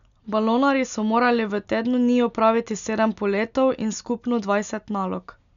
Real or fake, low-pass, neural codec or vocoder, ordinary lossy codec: real; 7.2 kHz; none; none